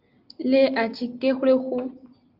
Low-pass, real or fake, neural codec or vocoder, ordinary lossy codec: 5.4 kHz; real; none; Opus, 32 kbps